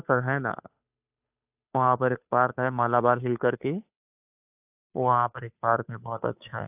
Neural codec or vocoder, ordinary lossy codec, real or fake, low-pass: codec, 16 kHz, 2 kbps, FunCodec, trained on Chinese and English, 25 frames a second; AAC, 32 kbps; fake; 3.6 kHz